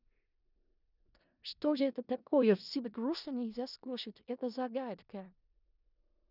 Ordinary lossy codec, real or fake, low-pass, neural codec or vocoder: none; fake; 5.4 kHz; codec, 16 kHz in and 24 kHz out, 0.4 kbps, LongCat-Audio-Codec, four codebook decoder